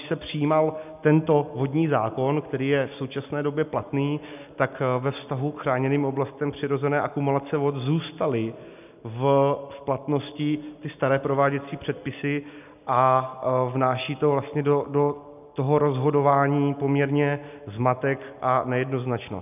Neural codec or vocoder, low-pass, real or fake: none; 3.6 kHz; real